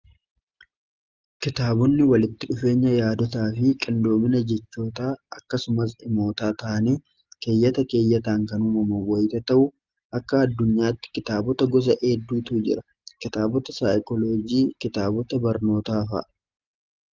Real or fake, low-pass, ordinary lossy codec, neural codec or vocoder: real; 7.2 kHz; Opus, 32 kbps; none